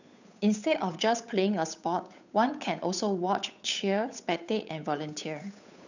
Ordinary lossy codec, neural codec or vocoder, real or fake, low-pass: none; codec, 16 kHz, 8 kbps, FunCodec, trained on Chinese and English, 25 frames a second; fake; 7.2 kHz